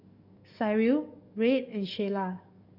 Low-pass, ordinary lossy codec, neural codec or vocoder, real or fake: 5.4 kHz; none; codec, 44.1 kHz, 7.8 kbps, DAC; fake